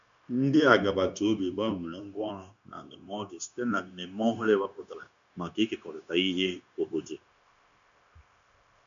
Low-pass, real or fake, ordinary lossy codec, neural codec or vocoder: 7.2 kHz; fake; none; codec, 16 kHz, 0.9 kbps, LongCat-Audio-Codec